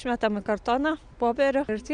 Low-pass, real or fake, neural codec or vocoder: 9.9 kHz; real; none